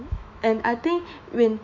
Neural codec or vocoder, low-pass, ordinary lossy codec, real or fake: autoencoder, 48 kHz, 128 numbers a frame, DAC-VAE, trained on Japanese speech; 7.2 kHz; none; fake